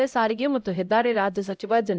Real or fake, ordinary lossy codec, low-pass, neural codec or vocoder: fake; none; none; codec, 16 kHz, 0.5 kbps, X-Codec, HuBERT features, trained on LibriSpeech